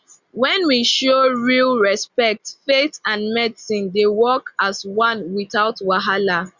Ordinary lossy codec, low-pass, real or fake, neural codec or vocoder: Opus, 64 kbps; 7.2 kHz; real; none